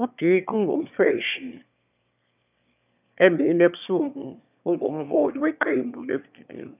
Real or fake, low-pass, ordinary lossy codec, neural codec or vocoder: fake; 3.6 kHz; none; autoencoder, 22.05 kHz, a latent of 192 numbers a frame, VITS, trained on one speaker